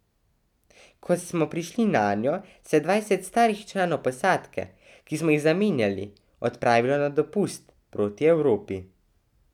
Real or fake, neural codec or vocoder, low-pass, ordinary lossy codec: real; none; 19.8 kHz; none